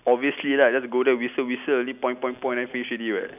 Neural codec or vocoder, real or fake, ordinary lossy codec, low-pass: none; real; none; 3.6 kHz